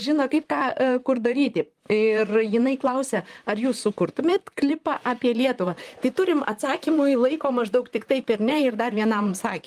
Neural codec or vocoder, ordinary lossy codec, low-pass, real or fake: vocoder, 44.1 kHz, 128 mel bands, Pupu-Vocoder; Opus, 32 kbps; 14.4 kHz; fake